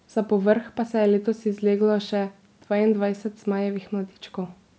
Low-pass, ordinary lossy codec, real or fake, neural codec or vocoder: none; none; real; none